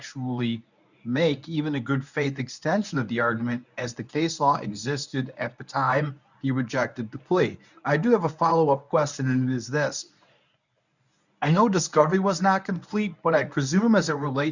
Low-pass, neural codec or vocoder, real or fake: 7.2 kHz; codec, 24 kHz, 0.9 kbps, WavTokenizer, medium speech release version 1; fake